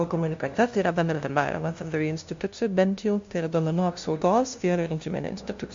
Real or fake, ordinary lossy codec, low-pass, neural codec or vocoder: fake; MP3, 48 kbps; 7.2 kHz; codec, 16 kHz, 0.5 kbps, FunCodec, trained on LibriTTS, 25 frames a second